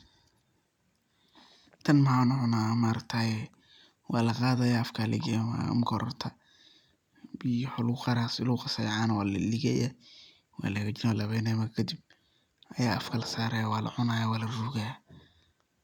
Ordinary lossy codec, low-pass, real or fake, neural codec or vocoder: none; 19.8 kHz; real; none